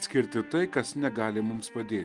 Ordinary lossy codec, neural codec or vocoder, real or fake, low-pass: Opus, 24 kbps; none; real; 10.8 kHz